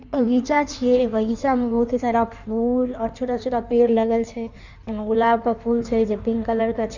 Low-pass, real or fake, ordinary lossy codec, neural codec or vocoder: 7.2 kHz; fake; none; codec, 16 kHz in and 24 kHz out, 1.1 kbps, FireRedTTS-2 codec